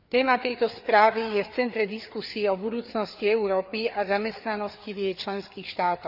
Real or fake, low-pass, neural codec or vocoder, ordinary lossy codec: fake; 5.4 kHz; codec, 16 kHz, 4 kbps, FreqCodec, larger model; none